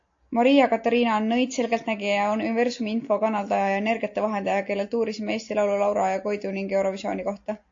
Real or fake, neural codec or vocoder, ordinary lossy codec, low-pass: real; none; AAC, 48 kbps; 7.2 kHz